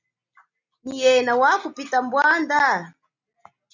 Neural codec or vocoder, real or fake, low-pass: none; real; 7.2 kHz